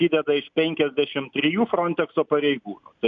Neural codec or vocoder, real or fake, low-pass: none; real; 7.2 kHz